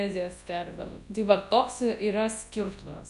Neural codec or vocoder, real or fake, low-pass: codec, 24 kHz, 0.9 kbps, WavTokenizer, large speech release; fake; 10.8 kHz